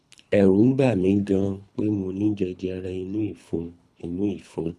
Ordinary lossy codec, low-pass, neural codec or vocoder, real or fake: none; none; codec, 24 kHz, 3 kbps, HILCodec; fake